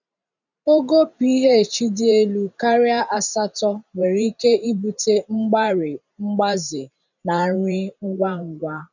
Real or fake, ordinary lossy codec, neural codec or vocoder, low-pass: fake; none; vocoder, 44.1 kHz, 128 mel bands every 512 samples, BigVGAN v2; 7.2 kHz